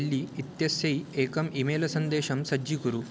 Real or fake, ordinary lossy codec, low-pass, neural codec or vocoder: real; none; none; none